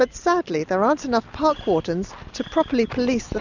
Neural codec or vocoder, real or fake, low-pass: none; real; 7.2 kHz